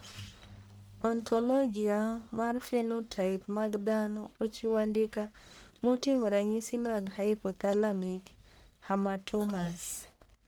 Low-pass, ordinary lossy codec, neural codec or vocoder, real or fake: none; none; codec, 44.1 kHz, 1.7 kbps, Pupu-Codec; fake